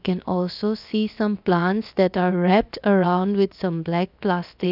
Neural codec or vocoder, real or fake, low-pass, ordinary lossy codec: codec, 16 kHz, about 1 kbps, DyCAST, with the encoder's durations; fake; 5.4 kHz; none